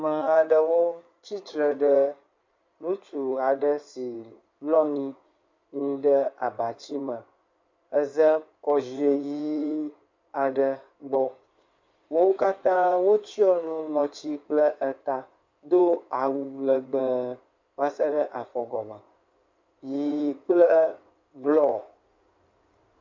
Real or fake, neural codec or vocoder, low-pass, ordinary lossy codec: fake; codec, 16 kHz in and 24 kHz out, 2.2 kbps, FireRedTTS-2 codec; 7.2 kHz; MP3, 64 kbps